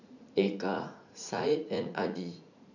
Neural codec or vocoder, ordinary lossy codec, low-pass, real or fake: vocoder, 44.1 kHz, 80 mel bands, Vocos; none; 7.2 kHz; fake